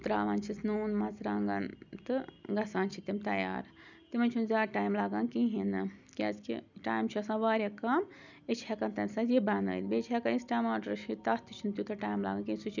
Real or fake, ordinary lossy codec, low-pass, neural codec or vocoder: real; none; 7.2 kHz; none